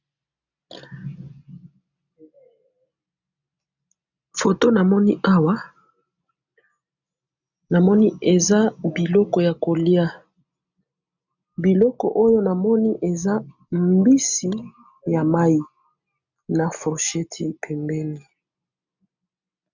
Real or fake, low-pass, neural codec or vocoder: real; 7.2 kHz; none